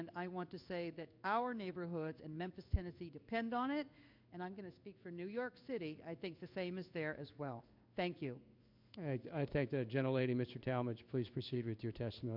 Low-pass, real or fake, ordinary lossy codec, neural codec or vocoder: 5.4 kHz; fake; MP3, 48 kbps; codec, 16 kHz in and 24 kHz out, 1 kbps, XY-Tokenizer